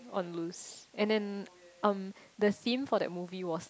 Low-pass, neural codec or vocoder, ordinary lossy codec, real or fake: none; none; none; real